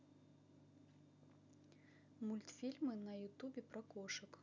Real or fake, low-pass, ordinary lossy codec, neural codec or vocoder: real; 7.2 kHz; none; none